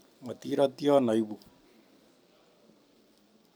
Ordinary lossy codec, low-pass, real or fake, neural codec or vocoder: none; none; real; none